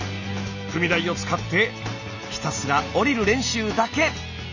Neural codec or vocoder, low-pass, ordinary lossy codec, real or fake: none; 7.2 kHz; none; real